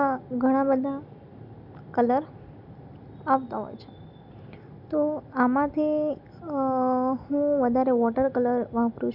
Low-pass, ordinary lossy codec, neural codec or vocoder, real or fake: 5.4 kHz; none; none; real